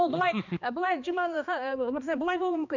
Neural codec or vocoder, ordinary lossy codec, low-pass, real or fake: codec, 16 kHz, 2 kbps, X-Codec, HuBERT features, trained on balanced general audio; none; 7.2 kHz; fake